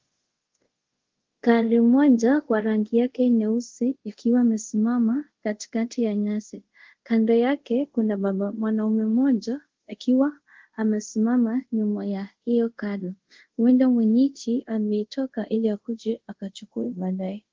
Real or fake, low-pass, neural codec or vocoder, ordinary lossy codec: fake; 7.2 kHz; codec, 24 kHz, 0.5 kbps, DualCodec; Opus, 16 kbps